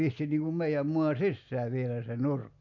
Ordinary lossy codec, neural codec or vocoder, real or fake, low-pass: AAC, 48 kbps; none; real; 7.2 kHz